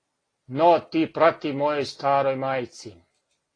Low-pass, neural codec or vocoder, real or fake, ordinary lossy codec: 9.9 kHz; none; real; AAC, 32 kbps